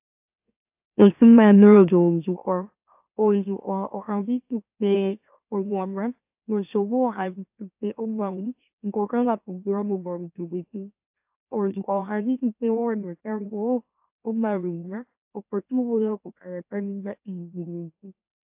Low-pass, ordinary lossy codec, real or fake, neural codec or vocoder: 3.6 kHz; AAC, 32 kbps; fake; autoencoder, 44.1 kHz, a latent of 192 numbers a frame, MeloTTS